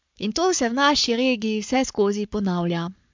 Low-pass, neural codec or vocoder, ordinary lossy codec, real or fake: 7.2 kHz; codec, 44.1 kHz, 7.8 kbps, Pupu-Codec; MP3, 64 kbps; fake